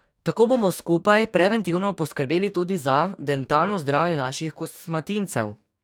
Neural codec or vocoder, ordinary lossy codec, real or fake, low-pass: codec, 44.1 kHz, 2.6 kbps, DAC; none; fake; 19.8 kHz